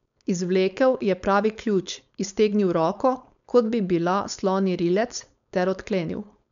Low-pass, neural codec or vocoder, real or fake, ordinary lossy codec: 7.2 kHz; codec, 16 kHz, 4.8 kbps, FACodec; fake; none